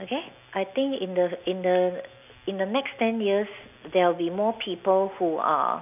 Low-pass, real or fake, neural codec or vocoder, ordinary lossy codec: 3.6 kHz; real; none; none